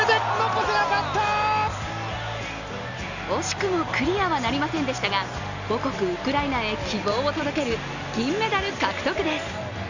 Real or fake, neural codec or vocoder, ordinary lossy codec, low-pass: real; none; none; 7.2 kHz